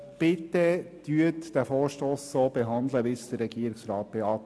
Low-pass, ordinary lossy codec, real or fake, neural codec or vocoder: none; none; real; none